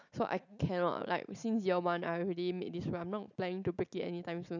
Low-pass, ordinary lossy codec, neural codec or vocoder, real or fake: 7.2 kHz; none; none; real